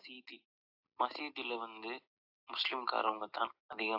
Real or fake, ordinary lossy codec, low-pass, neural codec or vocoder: real; AAC, 48 kbps; 5.4 kHz; none